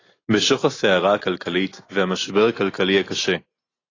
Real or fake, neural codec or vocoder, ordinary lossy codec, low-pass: real; none; AAC, 32 kbps; 7.2 kHz